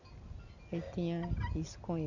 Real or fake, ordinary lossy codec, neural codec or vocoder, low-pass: real; none; none; 7.2 kHz